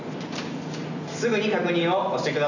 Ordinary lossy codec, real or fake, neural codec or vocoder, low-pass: none; real; none; 7.2 kHz